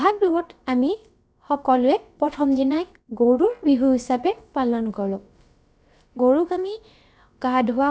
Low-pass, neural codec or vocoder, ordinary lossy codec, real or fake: none; codec, 16 kHz, about 1 kbps, DyCAST, with the encoder's durations; none; fake